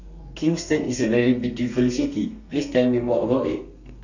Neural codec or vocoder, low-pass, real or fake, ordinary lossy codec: codec, 32 kHz, 1.9 kbps, SNAC; 7.2 kHz; fake; none